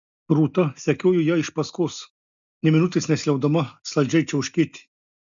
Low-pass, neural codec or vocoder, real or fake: 7.2 kHz; none; real